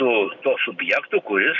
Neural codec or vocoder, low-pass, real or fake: none; 7.2 kHz; real